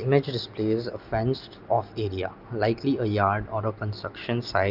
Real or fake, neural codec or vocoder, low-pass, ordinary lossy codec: real; none; 5.4 kHz; Opus, 24 kbps